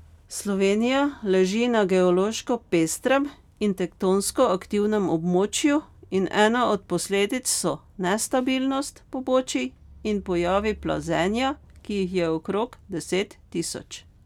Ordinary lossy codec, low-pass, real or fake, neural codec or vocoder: none; 19.8 kHz; real; none